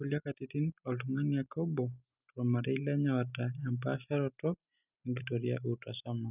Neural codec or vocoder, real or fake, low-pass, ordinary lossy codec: none; real; 3.6 kHz; none